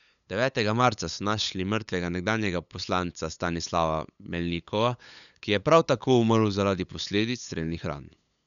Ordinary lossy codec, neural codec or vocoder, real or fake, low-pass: none; codec, 16 kHz, 8 kbps, FunCodec, trained on LibriTTS, 25 frames a second; fake; 7.2 kHz